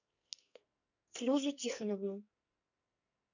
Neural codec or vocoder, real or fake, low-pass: codec, 44.1 kHz, 2.6 kbps, SNAC; fake; 7.2 kHz